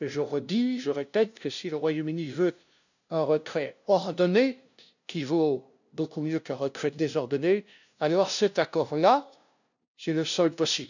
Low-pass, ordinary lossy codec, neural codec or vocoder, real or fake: 7.2 kHz; none; codec, 16 kHz, 0.5 kbps, FunCodec, trained on LibriTTS, 25 frames a second; fake